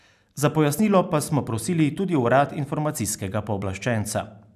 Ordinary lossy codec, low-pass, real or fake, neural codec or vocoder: none; 14.4 kHz; real; none